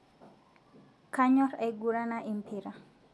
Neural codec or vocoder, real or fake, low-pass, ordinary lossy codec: none; real; none; none